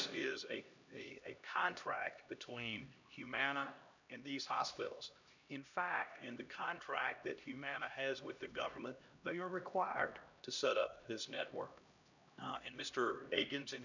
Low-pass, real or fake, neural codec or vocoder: 7.2 kHz; fake; codec, 16 kHz, 1 kbps, X-Codec, HuBERT features, trained on LibriSpeech